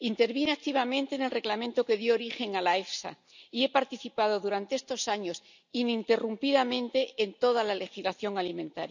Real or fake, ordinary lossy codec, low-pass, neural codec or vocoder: real; none; 7.2 kHz; none